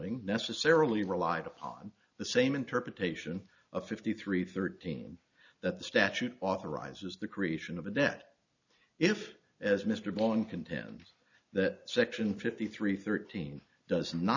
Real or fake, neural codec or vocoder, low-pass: real; none; 7.2 kHz